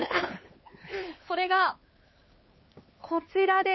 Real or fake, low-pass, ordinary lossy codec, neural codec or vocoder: fake; 7.2 kHz; MP3, 24 kbps; codec, 16 kHz, 4 kbps, X-Codec, HuBERT features, trained on LibriSpeech